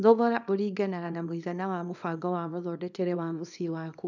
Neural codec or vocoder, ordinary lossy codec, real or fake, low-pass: codec, 24 kHz, 0.9 kbps, WavTokenizer, small release; none; fake; 7.2 kHz